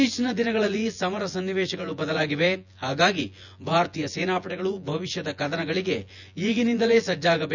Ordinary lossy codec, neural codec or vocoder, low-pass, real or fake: none; vocoder, 24 kHz, 100 mel bands, Vocos; 7.2 kHz; fake